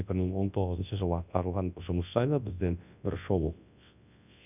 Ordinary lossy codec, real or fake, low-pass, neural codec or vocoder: none; fake; 3.6 kHz; codec, 24 kHz, 0.9 kbps, WavTokenizer, large speech release